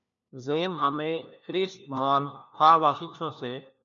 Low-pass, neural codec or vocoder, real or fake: 7.2 kHz; codec, 16 kHz, 1 kbps, FunCodec, trained on LibriTTS, 50 frames a second; fake